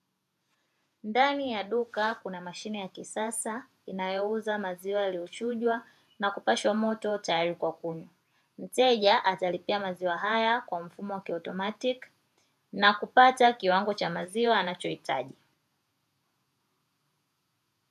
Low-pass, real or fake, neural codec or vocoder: 14.4 kHz; fake; vocoder, 48 kHz, 128 mel bands, Vocos